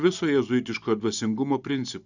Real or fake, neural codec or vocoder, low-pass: real; none; 7.2 kHz